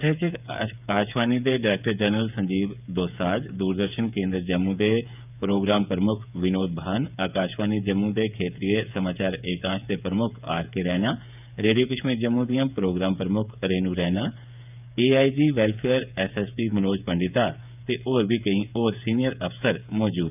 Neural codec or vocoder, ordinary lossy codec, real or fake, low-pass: codec, 16 kHz, 8 kbps, FreqCodec, smaller model; none; fake; 3.6 kHz